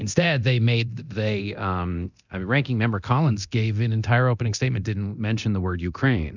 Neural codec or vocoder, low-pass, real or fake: codec, 24 kHz, 0.9 kbps, DualCodec; 7.2 kHz; fake